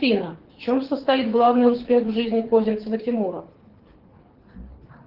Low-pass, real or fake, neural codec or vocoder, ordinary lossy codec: 5.4 kHz; fake; codec, 24 kHz, 6 kbps, HILCodec; Opus, 16 kbps